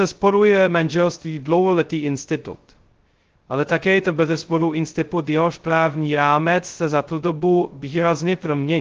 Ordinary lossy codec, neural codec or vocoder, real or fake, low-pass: Opus, 16 kbps; codec, 16 kHz, 0.2 kbps, FocalCodec; fake; 7.2 kHz